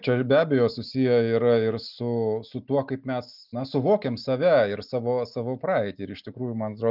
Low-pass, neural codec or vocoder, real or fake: 5.4 kHz; none; real